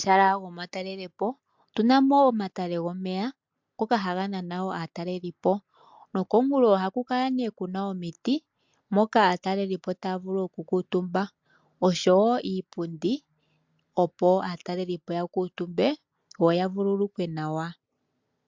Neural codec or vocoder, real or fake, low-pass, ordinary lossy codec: none; real; 7.2 kHz; MP3, 64 kbps